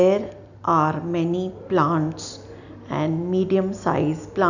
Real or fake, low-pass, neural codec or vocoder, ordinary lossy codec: real; 7.2 kHz; none; none